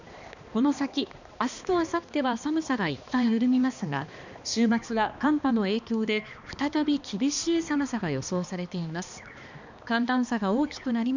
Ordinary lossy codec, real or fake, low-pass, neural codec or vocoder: none; fake; 7.2 kHz; codec, 16 kHz, 2 kbps, X-Codec, HuBERT features, trained on balanced general audio